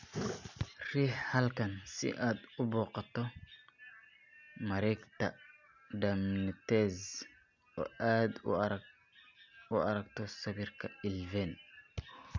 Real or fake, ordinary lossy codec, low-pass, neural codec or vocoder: real; none; 7.2 kHz; none